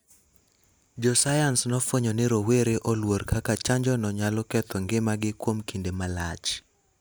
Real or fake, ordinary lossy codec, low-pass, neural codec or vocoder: real; none; none; none